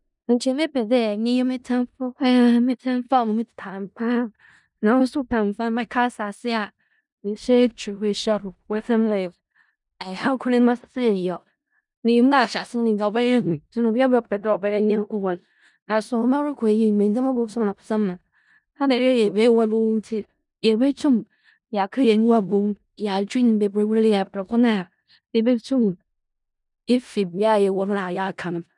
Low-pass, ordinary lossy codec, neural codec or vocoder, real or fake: 10.8 kHz; none; codec, 16 kHz in and 24 kHz out, 0.4 kbps, LongCat-Audio-Codec, four codebook decoder; fake